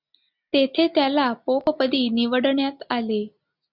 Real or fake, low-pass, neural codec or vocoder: real; 5.4 kHz; none